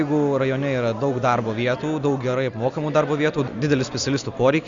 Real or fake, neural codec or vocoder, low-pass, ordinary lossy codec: real; none; 7.2 kHz; Opus, 64 kbps